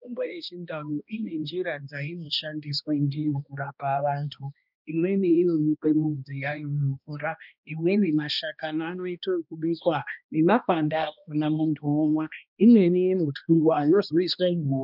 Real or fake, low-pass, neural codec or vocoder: fake; 5.4 kHz; codec, 16 kHz, 1 kbps, X-Codec, HuBERT features, trained on balanced general audio